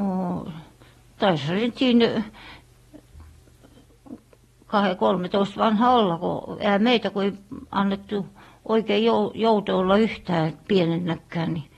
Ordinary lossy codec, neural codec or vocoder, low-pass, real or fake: AAC, 32 kbps; none; 19.8 kHz; real